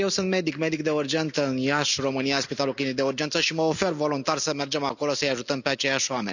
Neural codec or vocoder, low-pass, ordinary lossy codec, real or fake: none; 7.2 kHz; none; real